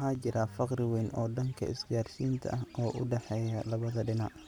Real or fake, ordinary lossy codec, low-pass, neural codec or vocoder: real; none; 19.8 kHz; none